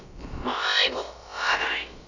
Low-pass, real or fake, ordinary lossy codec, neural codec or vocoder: 7.2 kHz; fake; none; codec, 16 kHz, about 1 kbps, DyCAST, with the encoder's durations